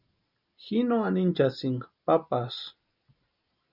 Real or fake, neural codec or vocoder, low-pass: real; none; 5.4 kHz